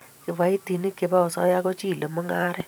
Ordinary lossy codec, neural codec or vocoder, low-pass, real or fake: none; vocoder, 44.1 kHz, 128 mel bands every 512 samples, BigVGAN v2; none; fake